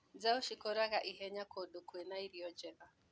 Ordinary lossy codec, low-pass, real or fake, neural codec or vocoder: none; none; real; none